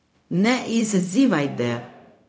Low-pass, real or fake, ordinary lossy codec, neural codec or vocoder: none; fake; none; codec, 16 kHz, 0.4 kbps, LongCat-Audio-Codec